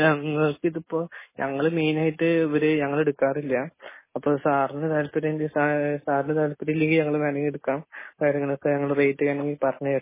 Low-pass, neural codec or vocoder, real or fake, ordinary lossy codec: 3.6 kHz; none; real; MP3, 16 kbps